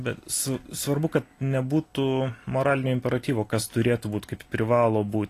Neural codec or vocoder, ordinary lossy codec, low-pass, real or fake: none; AAC, 48 kbps; 14.4 kHz; real